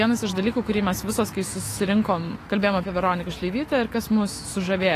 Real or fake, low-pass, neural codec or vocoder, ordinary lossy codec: fake; 14.4 kHz; autoencoder, 48 kHz, 128 numbers a frame, DAC-VAE, trained on Japanese speech; AAC, 48 kbps